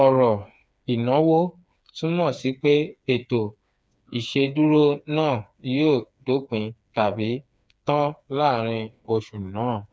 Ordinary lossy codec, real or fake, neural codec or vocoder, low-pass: none; fake; codec, 16 kHz, 4 kbps, FreqCodec, smaller model; none